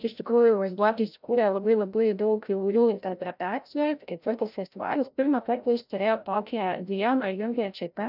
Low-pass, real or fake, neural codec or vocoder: 5.4 kHz; fake; codec, 16 kHz, 0.5 kbps, FreqCodec, larger model